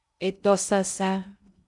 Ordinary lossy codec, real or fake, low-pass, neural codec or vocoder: MP3, 96 kbps; fake; 10.8 kHz; codec, 16 kHz in and 24 kHz out, 0.6 kbps, FocalCodec, streaming, 2048 codes